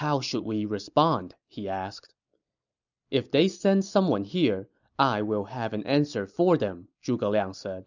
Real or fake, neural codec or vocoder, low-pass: real; none; 7.2 kHz